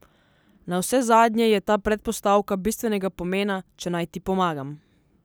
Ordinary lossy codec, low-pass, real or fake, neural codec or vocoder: none; none; real; none